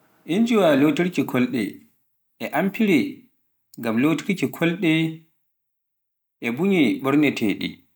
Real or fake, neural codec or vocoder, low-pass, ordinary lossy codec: real; none; none; none